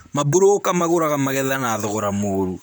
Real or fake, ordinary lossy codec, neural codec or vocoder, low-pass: fake; none; vocoder, 44.1 kHz, 128 mel bands, Pupu-Vocoder; none